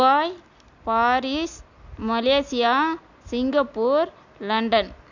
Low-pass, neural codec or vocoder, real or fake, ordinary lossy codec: 7.2 kHz; none; real; none